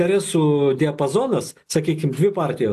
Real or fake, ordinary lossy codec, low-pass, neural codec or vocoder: real; AAC, 96 kbps; 14.4 kHz; none